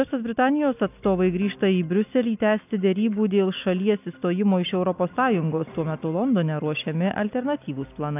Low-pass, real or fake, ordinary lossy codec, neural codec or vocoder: 3.6 kHz; real; AAC, 32 kbps; none